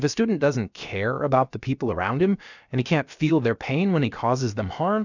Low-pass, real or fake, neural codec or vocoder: 7.2 kHz; fake; codec, 16 kHz, about 1 kbps, DyCAST, with the encoder's durations